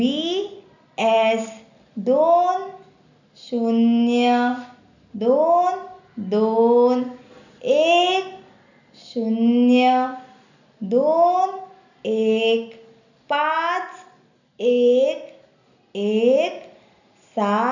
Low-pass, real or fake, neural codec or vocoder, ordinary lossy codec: 7.2 kHz; real; none; none